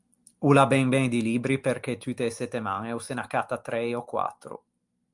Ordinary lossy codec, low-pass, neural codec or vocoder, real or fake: Opus, 32 kbps; 10.8 kHz; none; real